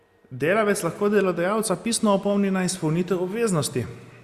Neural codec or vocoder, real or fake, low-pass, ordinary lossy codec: none; real; 14.4 kHz; Opus, 64 kbps